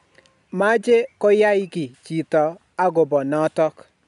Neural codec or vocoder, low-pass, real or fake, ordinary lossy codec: none; 10.8 kHz; real; none